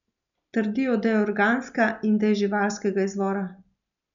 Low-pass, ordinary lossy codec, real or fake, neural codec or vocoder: 7.2 kHz; none; real; none